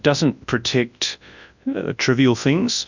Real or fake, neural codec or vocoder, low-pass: fake; codec, 24 kHz, 0.9 kbps, WavTokenizer, large speech release; 7.2 kHz